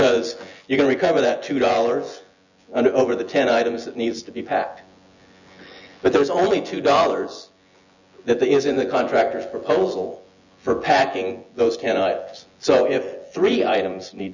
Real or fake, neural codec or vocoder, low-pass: fake; vocoder, 24 kHz, 100 mel bands, Vocos; 7.2 kHz